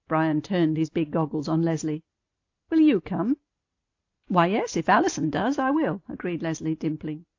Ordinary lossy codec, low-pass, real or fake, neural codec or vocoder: AAC, 48 kbps; 7.2 kHz; real; none